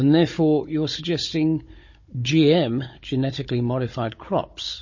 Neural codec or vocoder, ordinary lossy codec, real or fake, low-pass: codec, 16 kHz, 16 kbps, FunCodec, trained on Chinese and English, 50 frames a second; MP3, 32 kbps; fake; 7.2 kHz